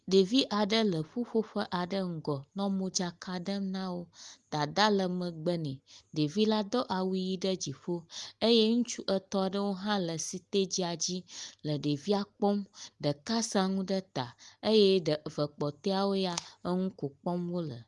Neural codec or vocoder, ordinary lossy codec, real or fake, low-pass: none; Opus, 24 kbps; real; 10.8 kHz